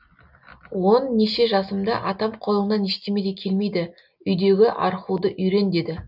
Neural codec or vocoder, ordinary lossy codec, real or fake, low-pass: none; none; real; 5.4 kHz